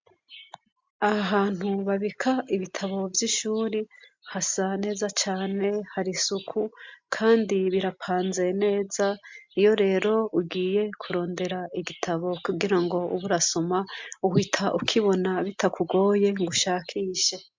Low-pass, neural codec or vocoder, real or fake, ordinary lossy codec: 7.2 kHz; none; real; MP3, 64 kbps